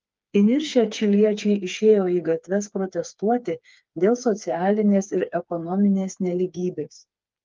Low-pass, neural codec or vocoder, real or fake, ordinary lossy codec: 7.2 kHz; codec, 16 kHz, 4 kbps, FreqCodec, smaller model; fake; Opus, 24 kbps